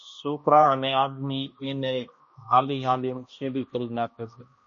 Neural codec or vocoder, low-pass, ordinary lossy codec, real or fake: codec, 16 kHz, 1 kbps, X-Codec, HuBERT features, trained on balanced general audio; 7.2 kHz; MP3, 32 kbps; fake